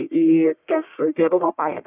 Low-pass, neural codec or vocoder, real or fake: 3.6 kHz; codec, 44.1 kHz, 1.7 kbps, Pupu-Codec; fake